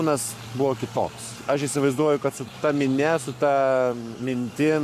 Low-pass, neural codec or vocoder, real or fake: 14.4 kHz; codec, 44.1 kHz, 7.8 kbps, Pupu-Codec; fake